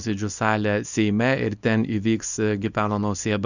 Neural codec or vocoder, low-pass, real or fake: codec, 24 kHz, 0.9 kbps, WavTokenizer, small release; 7.2 kHz; fake